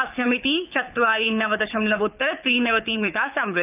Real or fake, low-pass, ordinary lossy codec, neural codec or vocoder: fake; 3.6 kHz; none; codec, 24 kHz, 6 kbps, HILCodec